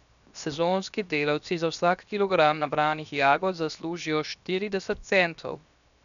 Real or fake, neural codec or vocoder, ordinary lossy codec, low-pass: fake; codec, 16 kHz, 0.7 kbps, FocalCodec; none; 7.2 kHz